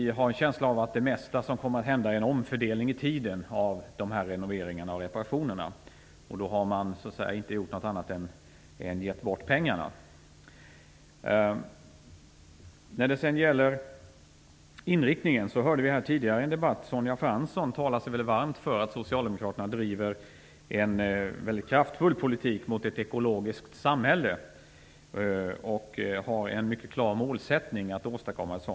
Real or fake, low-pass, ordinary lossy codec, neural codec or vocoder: real; none; none; none